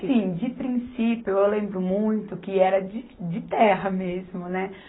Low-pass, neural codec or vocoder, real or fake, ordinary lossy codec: 7.2 kHz; none; real; AAC, 16 kbps